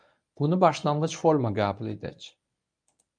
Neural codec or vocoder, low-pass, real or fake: codec, 24 kHz, 0.9 kbps, WavTokenizer, medium speech release version 1; 9.9 kHz; fake